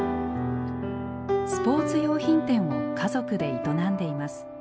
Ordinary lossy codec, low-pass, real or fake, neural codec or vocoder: none; none; real; none